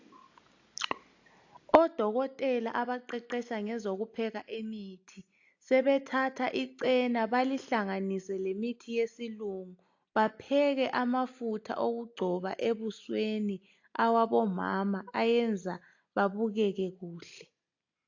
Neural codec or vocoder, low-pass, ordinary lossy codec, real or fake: none; 7.2 kHz; AAC, 48 kbps; real